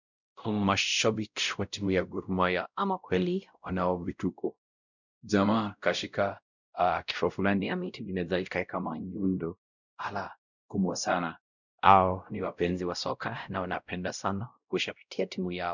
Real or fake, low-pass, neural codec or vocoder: fake; 7.2 kHz; codec, 16 kHz, 0.5 kbps, X-Codec, WavLM features, trained on Multilingual LibriSpeech